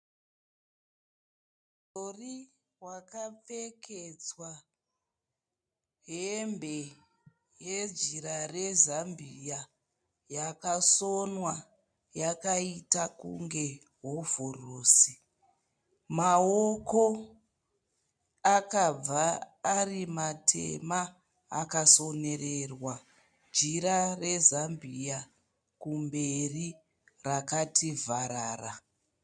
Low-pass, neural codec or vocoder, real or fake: 9.9 kHz; none; real